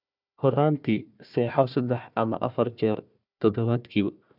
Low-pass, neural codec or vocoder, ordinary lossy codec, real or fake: 5.4 kHz; codec, 16 kHz, 1 kbps, FunCodec, trained on Chinese and English, 50 frames a second; none; fake